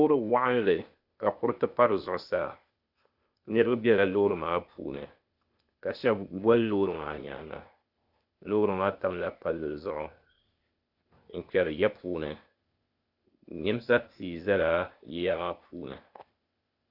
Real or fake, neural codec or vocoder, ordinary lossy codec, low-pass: fake; codec, 16 kHz, 0.8 kbps, ZipCodec; Opus, 64 kbps; 5.4 kHz